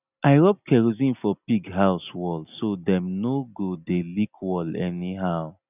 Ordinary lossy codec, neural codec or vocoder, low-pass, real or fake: none; none; 3.6 kHz; real